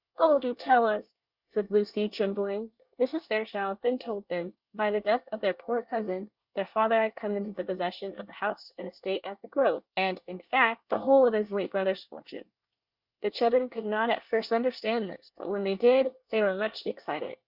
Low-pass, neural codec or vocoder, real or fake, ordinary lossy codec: 5.4 kHz; codec, 24 kHz, 1 kbps, SNAC; fake; Opus, 64 kbps